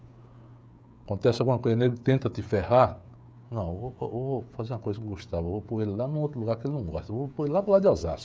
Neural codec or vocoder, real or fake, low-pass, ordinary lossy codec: codec, 16 kHz, 16 kbps, FreqCodec, smaller model; fake; none; none